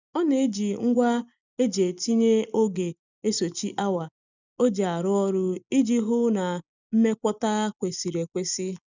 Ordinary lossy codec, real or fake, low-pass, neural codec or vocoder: none; real; 7.2 kHz; none